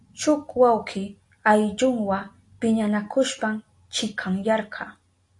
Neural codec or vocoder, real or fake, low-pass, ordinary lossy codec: none; real; 10.8 kHz; AAC, 48 kbps